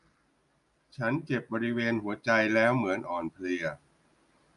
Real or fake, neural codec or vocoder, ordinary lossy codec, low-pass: real; none; none; 10.8 kHz